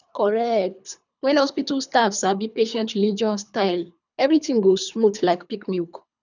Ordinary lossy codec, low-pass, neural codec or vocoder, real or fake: none; 7.2 kHz; codec, 24 kHz, 3 kbps, HILCodec; fake